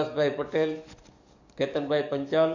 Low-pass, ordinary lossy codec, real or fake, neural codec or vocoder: 7.2 kHz; MP3, 64 kbps; fake; codec, 44.1 kHz, 7.8 kbps, DAC